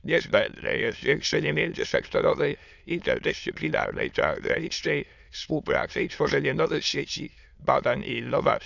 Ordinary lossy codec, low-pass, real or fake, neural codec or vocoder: none; 7.2 kHz; fake; autoencoder, 22.05 kHz, a latent of 192 numbers a frame, VITS, trained on many speakers